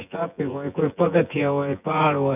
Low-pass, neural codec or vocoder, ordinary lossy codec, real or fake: 3.6 kHz; vocoder, 24 kHz, 100 mel bands, Vocos; none; fake